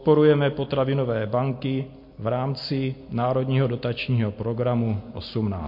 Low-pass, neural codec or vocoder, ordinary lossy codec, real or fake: 5.4 kHz; none; MP3, 32 kbps; real